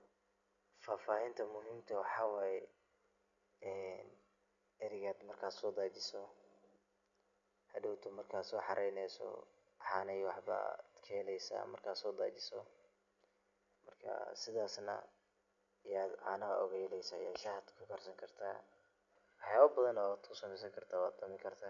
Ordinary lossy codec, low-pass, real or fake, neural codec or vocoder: none; 7.2 kHz; real; none